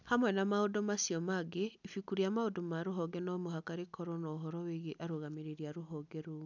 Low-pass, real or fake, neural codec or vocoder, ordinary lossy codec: 7.2 kHz; real; none; none